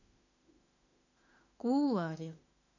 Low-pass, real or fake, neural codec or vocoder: 7.2 kHz; fake; autoencoder, 48 kHz, 32 numbers a frame, DAC-VAE, trained on Japanese speech